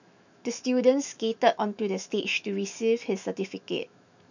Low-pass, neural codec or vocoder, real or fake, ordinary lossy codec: 7.2 kHz; autoencoder, 48 kHz, 128 numbers a frame, DAC-VAE, trained on Japanese speech; fake; none